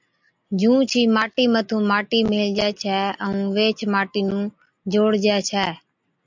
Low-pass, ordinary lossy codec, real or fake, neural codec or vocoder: 7.2 kHz; MP3, 64 kbps; real; none